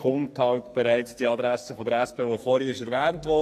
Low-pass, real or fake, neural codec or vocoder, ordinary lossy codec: 14.4 kHz; fake; codec, 44.1 kHz, 2.6 kbps, SNAC; AAC, 64 kbps